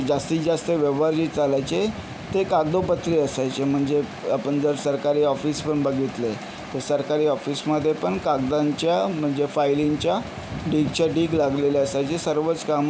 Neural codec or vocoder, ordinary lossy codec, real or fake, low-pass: none; none; real; none